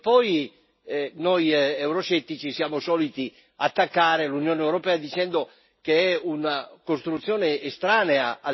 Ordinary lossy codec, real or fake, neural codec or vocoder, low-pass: MP3, 24 kbps; real; none; 7.2 kHz